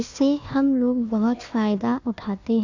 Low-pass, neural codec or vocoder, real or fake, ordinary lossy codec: 7.2 kHz; codec, 16 kHz, 1 kbps, FunCodec, trained on Chinese and English, 50 frames a second; fake; AAC, 48 kbps